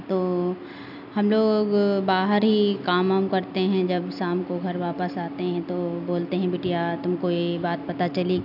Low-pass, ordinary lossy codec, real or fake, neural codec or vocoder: 5.4 kHz; none; real; none